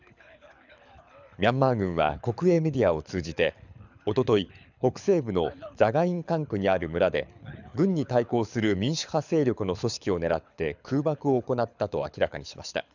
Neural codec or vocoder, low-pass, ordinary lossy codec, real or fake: codec, 24 kHz, 6 kbps, HILCodec; 7.2 kHz; none; fake